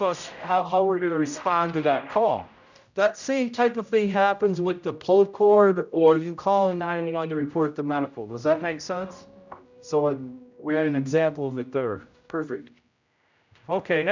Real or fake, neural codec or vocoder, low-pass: fake; codec, 16 kHz, 0.5 kbps, X-Codec, HuBERT features, trained on general audio; 7.2 kHz